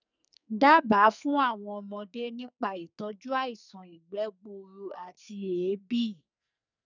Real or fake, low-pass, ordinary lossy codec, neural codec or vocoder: fake; 7.2 kHz; none; codec, 44.1 kHz, 2.6 kbps, SNAC